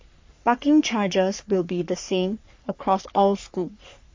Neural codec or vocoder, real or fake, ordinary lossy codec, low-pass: codec, 44.1 kHz, 3.4 kbps, Pupu-Codec; fake; MP3, 48 kbps; 7.2 kHz